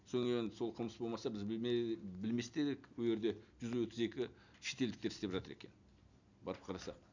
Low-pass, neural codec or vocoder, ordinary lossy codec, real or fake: 7.2 kHz; none; none; real